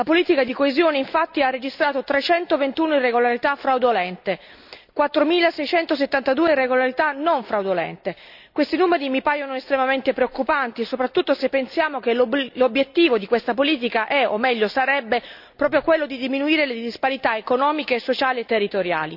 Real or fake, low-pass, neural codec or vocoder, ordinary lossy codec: real; 5.4 kHz; none; none